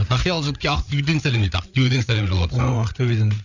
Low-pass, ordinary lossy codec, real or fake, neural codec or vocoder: 7.2 kHz; none; fake; codec, 16 kHz, 8 kbps, FreqCodec, larger model